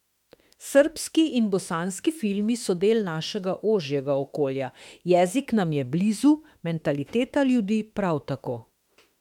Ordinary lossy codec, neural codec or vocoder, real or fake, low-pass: MP3, 96 kbps; autoencoder, 48 kHz, 32 numbers a frame, DAC-VAE, trained on Japanese speech; fake; 19.8 kHz